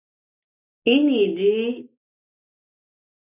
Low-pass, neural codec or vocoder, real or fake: 3.6 kHz; none; real